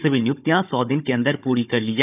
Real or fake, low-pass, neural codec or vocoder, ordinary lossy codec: fake; 3.6 kHz; codec, 16 kHz, 16 kbps, FunCodec, trained on Chinese and English, 50 frames a second; none